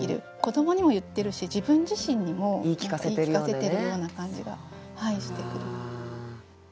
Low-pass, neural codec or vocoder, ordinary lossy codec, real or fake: none; none; none; real